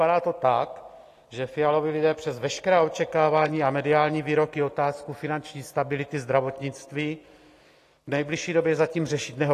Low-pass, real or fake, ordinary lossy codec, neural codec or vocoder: 14.4 kHz; real; AAC, 48 kbps; none